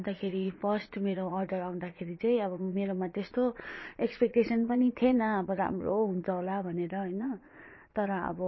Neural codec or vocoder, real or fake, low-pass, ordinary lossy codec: vocoder, 22.05 kHz, 80 mel bands, Vocos; fake; 7.2 kHz; MP3, 24 kbps